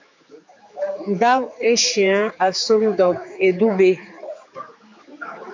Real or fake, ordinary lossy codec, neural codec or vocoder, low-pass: fake; MP3, 48 kbps; codec, 16 kHz, 4 kbps, X-Codec, HuBERT features, trained on general audio; 7.2 kHz